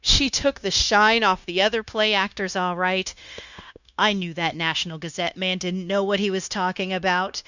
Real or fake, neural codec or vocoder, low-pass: fake; codec, 16 kHz, 0.9 kbps, LongCat-Audio-Codec; 7.2 kHz